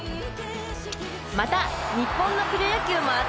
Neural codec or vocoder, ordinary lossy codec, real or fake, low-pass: none; none; real; none